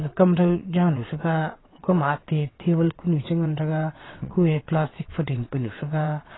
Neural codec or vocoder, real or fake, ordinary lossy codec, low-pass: vocoder, 22.05 kHz, 80 mel bands, WaveNeXt; fake; AAC, 16 kbps; 7.2 kHz